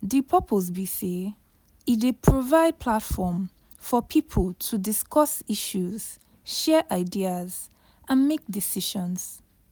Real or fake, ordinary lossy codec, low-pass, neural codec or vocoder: real; none; none; none